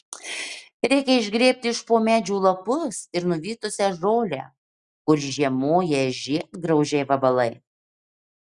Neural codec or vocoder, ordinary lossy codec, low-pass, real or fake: none; Opus, 64 kbps; 10.8 kHz; real